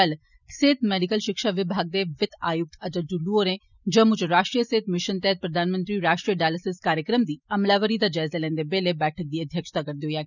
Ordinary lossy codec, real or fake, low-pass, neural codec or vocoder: none; real; 7.2 kHz; none